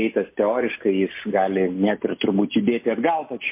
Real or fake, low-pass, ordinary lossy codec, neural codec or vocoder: real; 3.6 kHz; MP3, 24 kbps; none